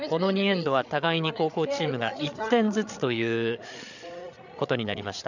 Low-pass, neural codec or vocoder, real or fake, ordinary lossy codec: 7.2 kHz; codec, 16 kHz, 8 kbps, FreqCodec, larger model; fake; none